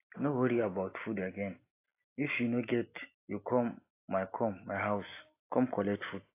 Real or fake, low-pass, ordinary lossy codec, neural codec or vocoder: real; 3.6 kHz; AAC, 24 kbps; none